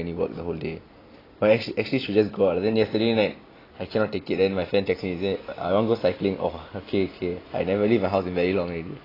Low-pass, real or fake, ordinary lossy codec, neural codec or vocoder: 5.4 kHz; real; AAC, 24 kbps; none